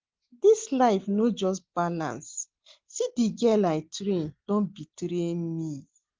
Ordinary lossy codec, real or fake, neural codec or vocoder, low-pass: Opus, 16 kbps; real; none; 7.2 kHz